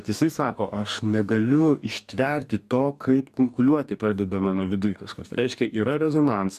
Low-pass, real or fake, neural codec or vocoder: 14.4 kHz; fake; codec, 44.1 kHz, 2.6 kbps, DAC